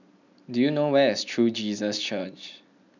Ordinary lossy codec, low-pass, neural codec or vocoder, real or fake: none; 7.2 kHz; none; real